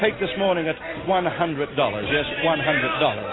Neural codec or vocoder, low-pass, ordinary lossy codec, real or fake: none; 7.2 kHz; AAC, 16 kbps; real